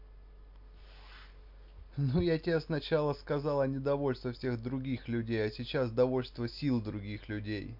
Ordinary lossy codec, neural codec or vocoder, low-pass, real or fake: MP3, 48 kbps; none; 5.4 kHz; real